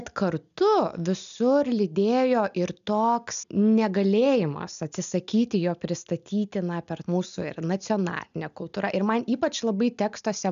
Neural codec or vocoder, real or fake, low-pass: none; real; 7.2 kHz